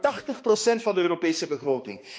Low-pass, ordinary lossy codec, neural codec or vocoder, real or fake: none; none; codec, 16 kHz, 2 kbps, X-Codec, HuBERT features, trained on balanced general audio; fake